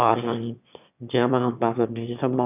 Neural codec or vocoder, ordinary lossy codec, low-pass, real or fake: autoencoder, 22.05 kHz, a latent of 192 numbers a frame, VITS, trained on one speaker; none; 3.6 kHz; fake